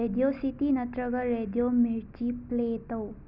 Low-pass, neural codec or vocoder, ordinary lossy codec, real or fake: 5.4 kHz; none; none; real